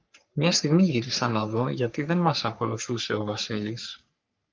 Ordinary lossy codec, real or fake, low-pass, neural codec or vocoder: Opus, 24 kbps; fake; 7.2 kHz; codec, 44.1 kHz, 3.4 kbps, Pupu-Codec